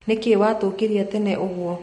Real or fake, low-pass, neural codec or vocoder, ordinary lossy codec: real; 19.8 kHz; none; MP3, 48 kbps